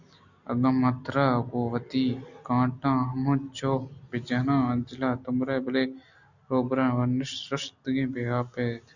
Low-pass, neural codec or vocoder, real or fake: 7.2 kHz; none; real